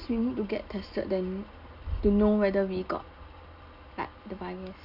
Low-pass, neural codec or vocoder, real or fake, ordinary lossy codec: 5.4 kHz; none; real; none